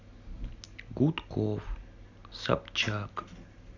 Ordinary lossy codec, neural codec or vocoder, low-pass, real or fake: none; none; 7.2 kHz; real